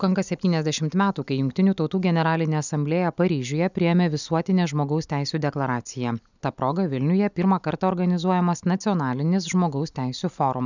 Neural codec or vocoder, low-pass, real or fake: none; 7.2 kHz; real